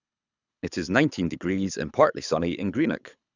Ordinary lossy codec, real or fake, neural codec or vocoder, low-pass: none; fake; codec, 24 kHz, 6 kbps, HILCodec; 7.2 kHz